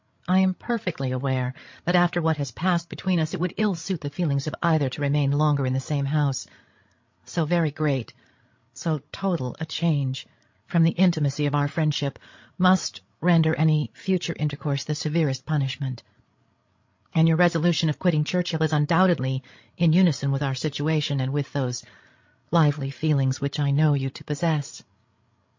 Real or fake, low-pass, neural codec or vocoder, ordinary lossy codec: fake; 7.2 kHz; codec, 16 kHz, 16 kbps, FreqCodec, larger model; MP3, 48 kbps